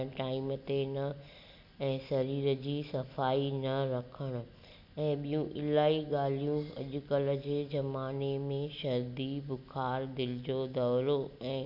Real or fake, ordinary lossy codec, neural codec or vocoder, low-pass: real; none; none; 5.4 kHz